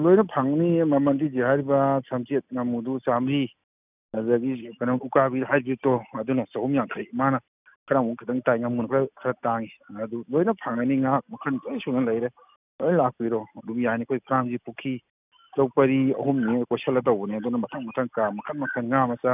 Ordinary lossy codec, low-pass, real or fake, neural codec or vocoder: none; 3.6 kHz; real; none